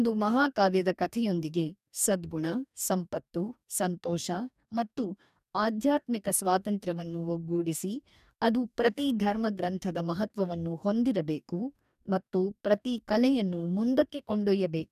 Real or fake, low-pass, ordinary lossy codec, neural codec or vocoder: fake; 14.4 kHz; none; codec, 44.1 kHz, 2.6 kbps, DAC